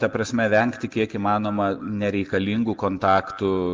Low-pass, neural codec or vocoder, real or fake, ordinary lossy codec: 7.2 kHz; none; real; Opus, 32 kbps